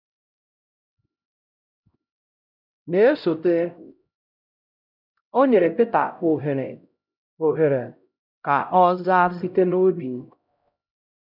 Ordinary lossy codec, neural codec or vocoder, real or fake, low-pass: none; codec, 16 kHz, 0.5 kbps, X-Codec, HuBERT features, trained on LibriSpeech; fake; 5.4 kHz